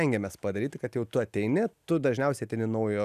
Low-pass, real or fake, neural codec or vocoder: 14.4 kHz; real; none